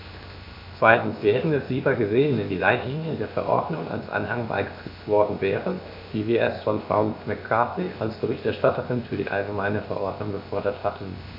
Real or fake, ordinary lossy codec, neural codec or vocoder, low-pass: fake; MP3, 48 kbps; codec, 16 kHz, 0.7 kbps, FocalCodec; 5.4 kHz